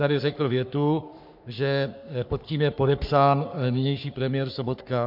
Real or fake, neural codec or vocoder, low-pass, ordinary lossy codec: fake; codec, 44.1 kHz, 3.4 kbps, Pupu-Codec; 5.4 kHz; MP3, 48 kbps